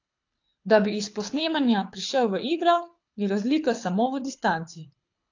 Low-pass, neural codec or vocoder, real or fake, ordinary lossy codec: 7.2 kHz; codec, 24 kHz, 6 kbps, HILCodec; fake; AAC, 48 kbps